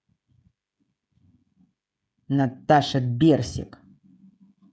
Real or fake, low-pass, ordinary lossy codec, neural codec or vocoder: fake; none; none; codec, 16 kHz, 16 kbps, FreqCodec, smaller model